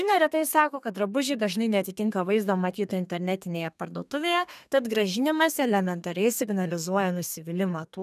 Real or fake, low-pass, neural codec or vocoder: fake; 14.4 kHz; codec, 32 kHz, 1.9 kbps, SNAC